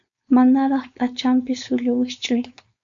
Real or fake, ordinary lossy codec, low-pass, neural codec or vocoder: fake; AAC, 64 kbps; 7.2 kHz; codec, 16 kHz, 4.8 kbps, FACodec